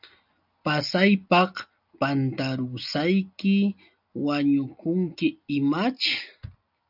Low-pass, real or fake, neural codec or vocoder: 5.4 kHz; real; none